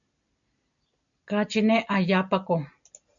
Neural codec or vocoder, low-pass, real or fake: none; 7.2 kHz; real